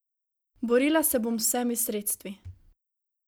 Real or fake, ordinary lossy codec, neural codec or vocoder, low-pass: real; none; none; none